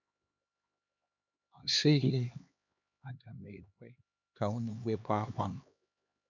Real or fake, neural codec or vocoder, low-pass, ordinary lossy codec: fake; codec, 16 kHz, 2 kbps, X-Codec, HuBERT features, trained on LibriSpeech; 7.2 kHz; none